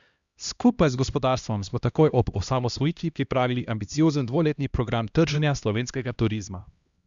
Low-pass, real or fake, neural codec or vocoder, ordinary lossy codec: 7.2 kHz; fake; codec, 16 kHz, 1 kbps, X-Codec, HuBERT features, trained on LibriSpeech; Opus, 64 kbps